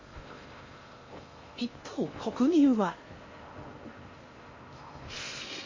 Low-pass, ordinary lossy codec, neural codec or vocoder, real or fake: 7.2 kHz; MP3, 32 kbps; codec, 16 kHz in and 24 kHz out, 0.6 kbps, FocalCodec, streaming, 4096 codes; fake